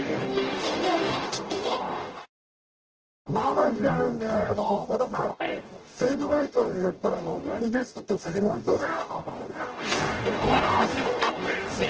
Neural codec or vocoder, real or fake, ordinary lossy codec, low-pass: codec, 44.1 kHz, 0.9 kbps, DAC; fake; Opus, 16 kbps; 7.2 kHz